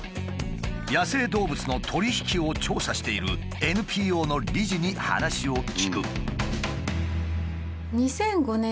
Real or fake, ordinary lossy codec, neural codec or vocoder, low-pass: real; none; none; none